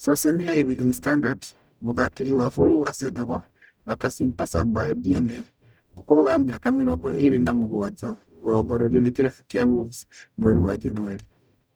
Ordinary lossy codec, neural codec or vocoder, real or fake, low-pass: none; codec, 44.1 kHz, 0.9 kbps, DAC; fake; none